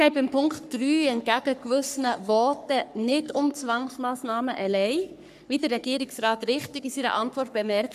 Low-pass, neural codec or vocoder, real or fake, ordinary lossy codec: 14.4 kHz; codec, 44.1 kHz, 3.4 kbps, Pupu-Codec; fake; none